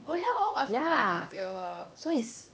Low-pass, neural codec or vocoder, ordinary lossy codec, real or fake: none; codec, 16 kHz, 2 kbps, X-Codec, HuBERT features, trained on LibriSpeech; none; fake